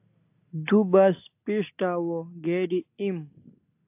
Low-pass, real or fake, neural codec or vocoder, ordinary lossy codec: 3.6 kHz; real; none; AAC, 32 kbps